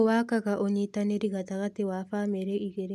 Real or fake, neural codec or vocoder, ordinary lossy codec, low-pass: real; none; none; 14.4 kHz